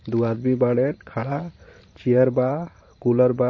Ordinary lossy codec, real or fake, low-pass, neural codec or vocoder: MP3, 32 kbps; fake; 7.2 kHz; vocoder, 44.1 kHz, 128 mel bands every 512 samples, BigVGAN v2